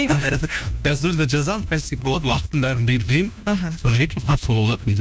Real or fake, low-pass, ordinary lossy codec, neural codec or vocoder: fake; none; none; codec, 16 kHz, 1 kbps, FunCodec, trained on LibriTTS, 50 frames a second